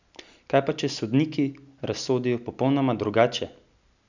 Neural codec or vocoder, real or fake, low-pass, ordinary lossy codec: none; real; 7.2 kHz; none